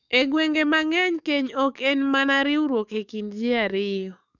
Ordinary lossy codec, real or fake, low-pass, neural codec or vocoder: none; fake; 7.2 kHz; codec, 44.1 kHz, 7.8 kbps, DAC